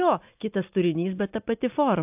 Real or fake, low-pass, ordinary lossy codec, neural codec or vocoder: real; 3.6 kHz; AAC, 32 kbps; none